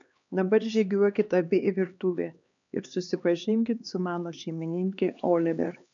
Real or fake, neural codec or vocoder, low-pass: fake; codec, 16 kHz, 2 kbps, X-Codec, HuBERT features, trained on LibriSpeech; 7.2 kHz